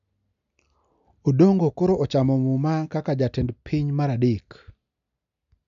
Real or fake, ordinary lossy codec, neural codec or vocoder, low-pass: real; none; none; 7.2 kHz